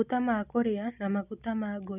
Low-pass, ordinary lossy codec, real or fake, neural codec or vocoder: 3.6 kHz; none; real; none